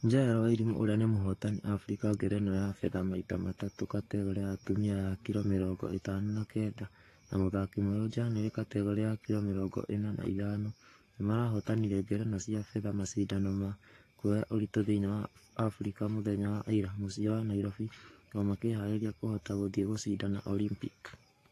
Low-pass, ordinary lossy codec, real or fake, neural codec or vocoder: 14.4 kHz; AAC, 48 kbps; fake; codec, 44.1 kHz, 7.8 kbps, Pupu-Codec